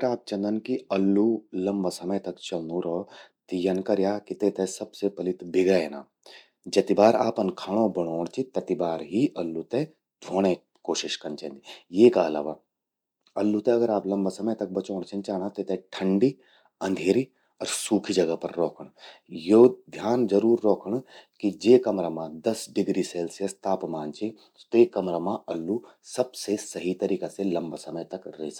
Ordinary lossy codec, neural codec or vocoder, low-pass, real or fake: none; none; 19.8 kHz; real